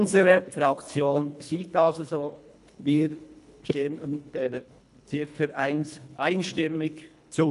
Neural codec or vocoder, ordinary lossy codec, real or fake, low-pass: codec, 24 kHz, 1.5 kbps, HILCodec; none; fake; 10.8 kHz